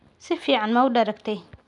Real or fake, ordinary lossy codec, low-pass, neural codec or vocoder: real; none; 10.8 kHz; none